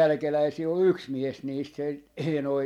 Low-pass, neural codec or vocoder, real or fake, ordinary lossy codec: 19.8 kHz; vocoder, 44.1 kHz, 128 mel bands every 256 samples, BigVGAN v2; fake; MP3, 96 kbps